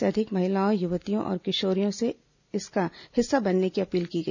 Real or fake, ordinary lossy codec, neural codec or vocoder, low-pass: real; none; none; 7.2 kHz